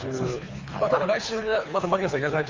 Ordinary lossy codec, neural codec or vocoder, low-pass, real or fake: Opus, 32 kbps; codec, 24 kHz, 3 kbps, HILCodec; 7.2 kHz; fake